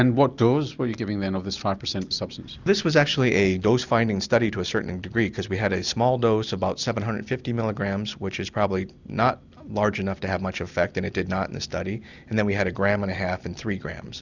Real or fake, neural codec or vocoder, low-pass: real; none; 7.2 kHz